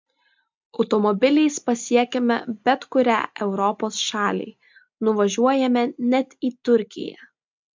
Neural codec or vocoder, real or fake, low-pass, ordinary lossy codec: none; real; 7.2 kHz; MP3, 64 kbps